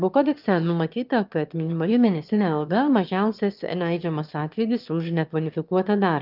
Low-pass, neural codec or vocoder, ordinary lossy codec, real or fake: 5.4 kHz; autoencoder, 22.05 kHz, a latent of 192 numbers a frame, VITS, trained on one speaker; Opus, 24 kbps; fake